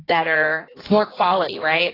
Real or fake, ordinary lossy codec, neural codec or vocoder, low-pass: fake; AAC, 24 kbps; codec, 16 kHz in and 24 kHz out, 1.1 kbps, FireRedTTS-2 codec; 5.4 kHz